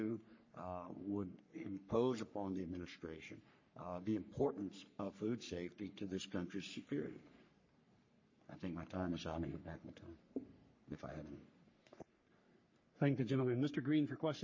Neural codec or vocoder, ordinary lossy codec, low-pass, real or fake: codec, 44.1 kHz, 3.4 kbps, Pupu-Codec; MP3, 32 kbps; 7.2 kHz; fake